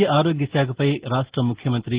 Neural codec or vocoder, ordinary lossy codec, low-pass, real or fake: none; Opus, 16 kbps; 3.6 kHz; real